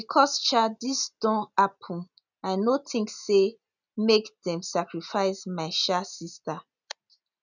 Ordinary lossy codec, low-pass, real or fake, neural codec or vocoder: none; 7.2 kHz; real; none